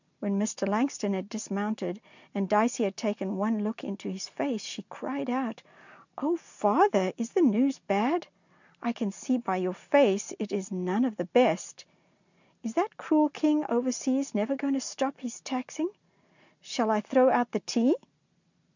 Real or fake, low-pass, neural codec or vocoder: real; 7.2 kHz; none